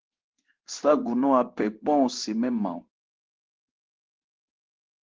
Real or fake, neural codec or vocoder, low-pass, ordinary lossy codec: fake; codec, 16 kHz in and 24 kHz out, 1 kbps, XY-Tokenizer; 7.2 kHz; Opus, 16 kbps